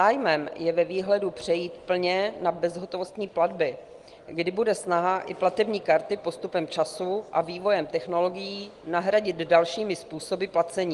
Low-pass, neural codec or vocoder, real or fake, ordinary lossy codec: 10.8 kHz; none; real; Opus, 24 kbps